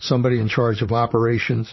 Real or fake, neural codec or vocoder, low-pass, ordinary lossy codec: fake; vocoder, 44.1 kHz, 128 mel bands, Pupu-Vocoder; 7.2 kHz; MP3, 24 kbps